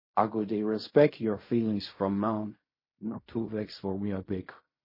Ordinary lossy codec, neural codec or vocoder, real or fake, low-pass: MP3, 24 kbps; codec, 16 kHz in and 24 kHz out, 0.4 kbps, LongCat-Audio-Codec, fine tuned four codebook decoder; fake; 5.4 kHz